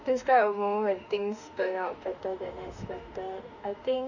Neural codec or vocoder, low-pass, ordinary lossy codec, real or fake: autoencoder, 48 kHz, 32 numbers a frame, DAC-VAE, trained on Japanese speech; 7.2 kHz; none; fake